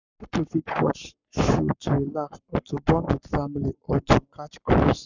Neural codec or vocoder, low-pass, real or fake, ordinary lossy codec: codec, 44.1 kHz, 7.8 kbps, Pupu-Codec; 7.2 kHz; fake; none